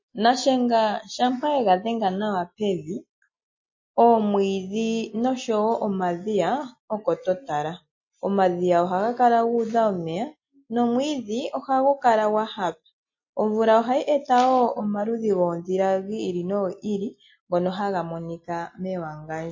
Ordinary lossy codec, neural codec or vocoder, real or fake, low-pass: MP3, 32 kbps; none; real; 7.2 kHz